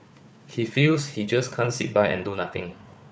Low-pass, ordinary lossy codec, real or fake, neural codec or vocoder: none; none; fake; codec, 16 kHz, 4 kbps, FunCodec, trained on Chinese and English, 50 frames a second